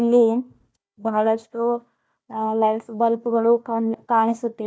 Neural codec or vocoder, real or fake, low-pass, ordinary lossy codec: codec, 16 kHz, 1 kbps, FunCodec, trained on Chinese and English, 50 frames a second; fake; none; none